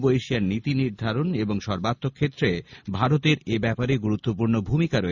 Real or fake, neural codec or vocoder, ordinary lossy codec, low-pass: real; none; none; 7.2 kHz